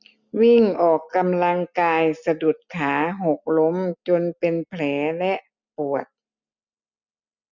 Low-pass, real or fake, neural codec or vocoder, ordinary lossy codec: 7.2 kHz; real; none; none